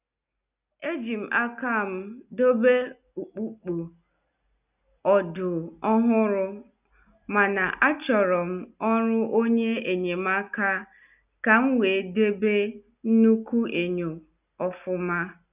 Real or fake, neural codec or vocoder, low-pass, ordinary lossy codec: real; none; 3.6 kHz; none